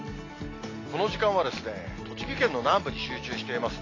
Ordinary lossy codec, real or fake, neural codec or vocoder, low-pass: AAC, 32 kbps; real; none; 7.2 kHz